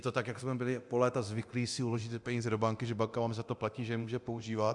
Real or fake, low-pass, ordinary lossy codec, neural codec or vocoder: fake; 10.8 kHz; Opus, 64 kbps; codec, 24 kHz, 0.9 kbps, DualCodec